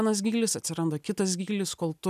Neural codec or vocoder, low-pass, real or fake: none; 14.4 kHz; real